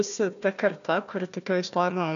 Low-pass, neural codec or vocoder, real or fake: 7.2 kHz; codec, 16 kHz, 1 kbps, FunCodec, trained on Chinese and English, 50 frames a second; fake